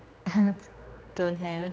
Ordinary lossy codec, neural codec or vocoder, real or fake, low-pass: none; codec, 16 kHz, 2 kbps, X-Codec, HuBERT features, trained on general audio; fake; none